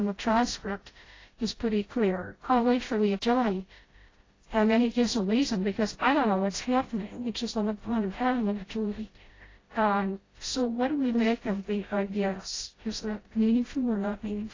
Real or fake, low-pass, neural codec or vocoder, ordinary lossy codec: fake; 7.2 kHz; codec, 16 kHz, 0.5 kbps, FreqCodec, smaller model; AAC, 32 kbps